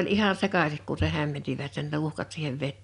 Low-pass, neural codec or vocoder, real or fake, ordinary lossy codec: 10.8 kHz; none; real; none